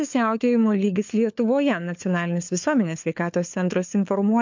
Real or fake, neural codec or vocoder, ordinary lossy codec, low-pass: fake; codec, 16 kHz, 4 kbps, FunCodec, trained on LibriTTS, 50 frames a second; MP3, 64 kbps; 7.2 kHz